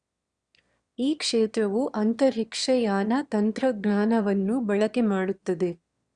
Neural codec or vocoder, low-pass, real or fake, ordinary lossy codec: autoencoder, 22.05 kHz, a latent of 192 numbers a frame, VITS, trained on one speaker; 9.9 kHz; fake; Opus, 64 kbps